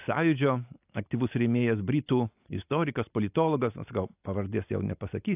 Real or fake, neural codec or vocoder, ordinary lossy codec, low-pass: real; none; AAC, 32 kbps; 3.6 kHz